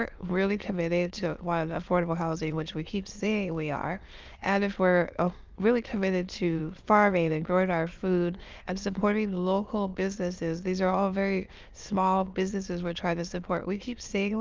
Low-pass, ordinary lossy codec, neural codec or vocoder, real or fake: 7.2 kHz; Opus, 32 kbps; autoencoder, 22.05 kHz, a latent of 192 numbers a frame, VITS, trained on many speakers; fake